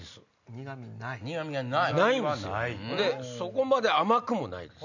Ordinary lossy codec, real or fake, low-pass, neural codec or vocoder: none; real; 7.2 kHz; none